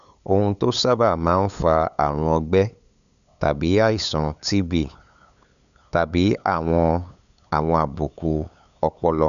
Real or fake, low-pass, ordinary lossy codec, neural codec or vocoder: fake; 7.2 kHz; none; codec, 16 kHz, 8 kbps, FunCodec, trained on LibriTTS, 25 frames a second